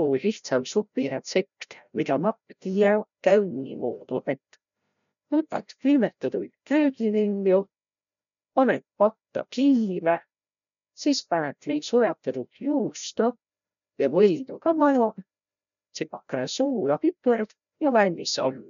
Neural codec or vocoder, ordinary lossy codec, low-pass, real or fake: codec, 16 kHz, 0.5 kbps, FreqCodec, larger model; none; 7.2 kHz; fake